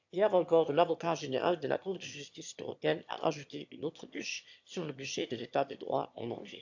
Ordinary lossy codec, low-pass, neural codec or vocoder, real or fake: AAC, 48 kbps; 7.2 kHz; autoencoder, 22.05 kHz, a latent of 192 numbers a frame, VITS, trained on one speaker; fake